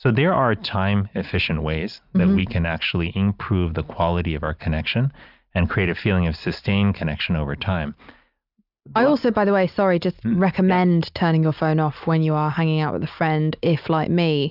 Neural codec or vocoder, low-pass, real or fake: none; 5.4 kHz; real